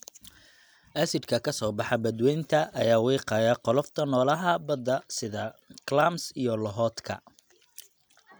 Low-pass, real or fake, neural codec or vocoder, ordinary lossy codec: none; real; none; none